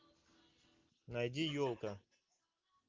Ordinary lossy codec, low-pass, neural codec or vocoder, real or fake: Opus, 32 kbps; 7.2 kHz; none; real